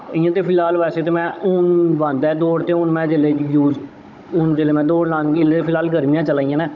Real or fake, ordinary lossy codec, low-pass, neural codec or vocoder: fake; none; 7.2 kHz; codec, 16 kHz, 16 kbps, FunCodec, trained on Chinese and English, 50 frames a second